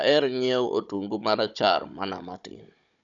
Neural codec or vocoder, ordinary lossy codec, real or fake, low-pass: codec, 16 kHz, 16 kbps, FunCodec, trained on Chinese and English, 50 frames a second; none; fake; 7.2 kHz